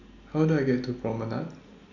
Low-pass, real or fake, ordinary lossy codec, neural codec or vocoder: 7.2 kHz; real; none; none